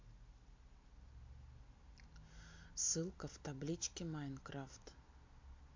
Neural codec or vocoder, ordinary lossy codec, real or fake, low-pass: none; MP3, 48 kbps; real; 7.2 kHz